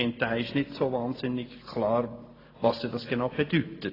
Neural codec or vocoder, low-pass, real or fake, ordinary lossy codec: none; 5.4 kHz; real; AAC, 24 kbps